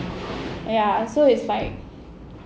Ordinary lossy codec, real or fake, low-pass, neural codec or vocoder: none; real; none; none